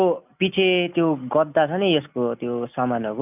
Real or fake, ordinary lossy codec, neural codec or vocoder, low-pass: real; none; none; 3.6 kHz